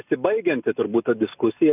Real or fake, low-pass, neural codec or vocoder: real; 3.6 kHz; none